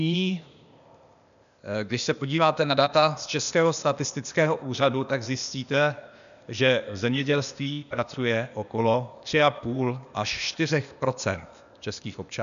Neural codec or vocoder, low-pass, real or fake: codec, 16 kHz, 0.8 kbps, ZipCodec; 7.2 kHz; fake